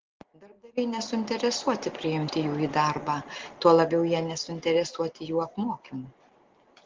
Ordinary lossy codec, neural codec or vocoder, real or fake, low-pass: Opus, 16 kbps; none; real; 7.2 kHz